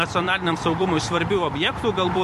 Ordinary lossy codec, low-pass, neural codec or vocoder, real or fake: MP3, 64 kbps; 14.4 kHz; vocoder, 44.1 kHz, 128 mel bands every 256 samples, BigVGAN v2; fake